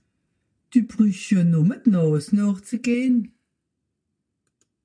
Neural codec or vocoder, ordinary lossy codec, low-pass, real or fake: none; MP3, 64 kbps; 9.9 kHz; real